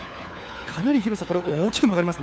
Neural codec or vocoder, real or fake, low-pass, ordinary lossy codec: codec, 16 kHz, 4 kbps, FunCodec, trained on LibriTTS, 50 frames a second; fake; none; none